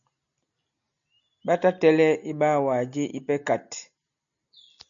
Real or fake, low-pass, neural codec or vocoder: real; 7.2 kHz; none